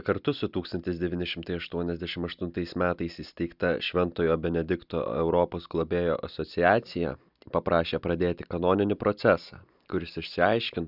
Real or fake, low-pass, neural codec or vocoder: real; 5.4 kHz; none